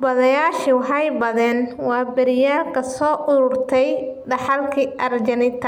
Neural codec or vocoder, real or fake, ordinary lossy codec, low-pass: none; real; MP3, 96 kbps; 19.8 kHz